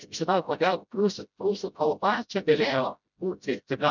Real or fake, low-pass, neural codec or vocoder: fake; 7.2 kHz; codec, 16 kHz, 0.5 kbps, FreqCodec, smaller model